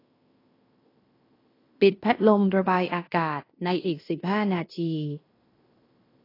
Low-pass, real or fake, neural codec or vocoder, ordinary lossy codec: 5.4 kHz; fake; codec, 16 kHz in and 24 kHz out, 0.9 kbps, LongCat-Audio-Codec, fine tuned four codebook decoder; AAC, 24 kbps